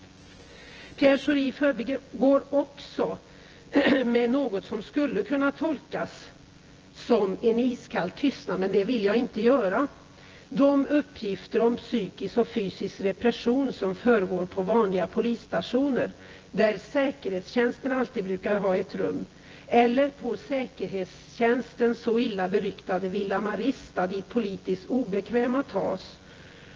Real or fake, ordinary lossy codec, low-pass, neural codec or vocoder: fake; Opus, 16 kbps; 7.2 kHz; vocoder, 24 kHz, 100 mel bands, Vocos